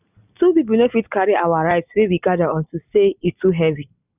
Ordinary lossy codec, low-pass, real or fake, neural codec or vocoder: none; 3.6 kHz; real; none